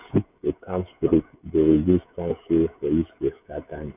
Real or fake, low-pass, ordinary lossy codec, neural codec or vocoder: fake; 3.6 kHz; none; codec, 16 kHz, 16 kbps, FreqCodec, smaller model